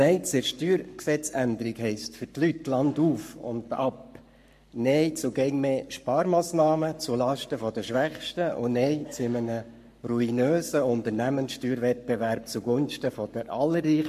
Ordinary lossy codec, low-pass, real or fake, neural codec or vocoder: MP3, 64 kbps; 14.4 kHz; fake; codec, 44.1 kHz, 7.8 kbps, Pupu-Codec